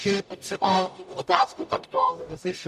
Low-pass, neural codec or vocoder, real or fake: 14.4 kHz; codec, 44.1 kHz, 0.9 kbps, DAC; fake